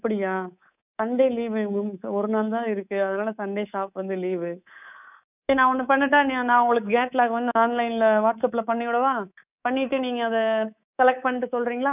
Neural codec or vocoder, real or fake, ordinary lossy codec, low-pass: codec, 24 kHz, 3.1 kbps, DualCodec; fake; none; 3.6 kHz